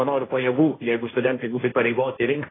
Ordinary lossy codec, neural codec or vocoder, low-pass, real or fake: AAC, 16 kbps; codec, 16 kHz, 1.1 kbps, Voila-Tokenizer; 7.2 kHz; fake